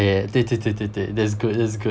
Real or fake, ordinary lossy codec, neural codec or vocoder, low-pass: real; none; none; none